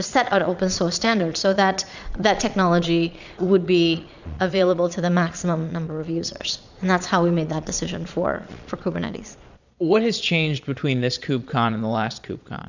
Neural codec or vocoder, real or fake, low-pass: vocoder, 22.05 kHz, 80 mel bands, Vocos; fake; 7.2 kHz